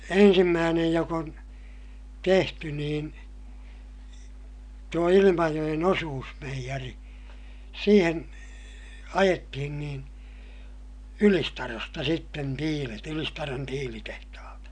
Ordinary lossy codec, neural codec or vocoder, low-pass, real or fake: none; none; 9.9 kHz; real